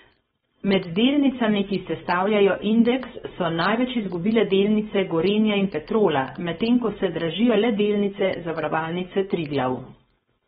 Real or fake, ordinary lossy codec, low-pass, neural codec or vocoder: fake; AAC, 16 kbps; 7.2 kHz; codec, 16 kHz, 4.8 kbps, FACodec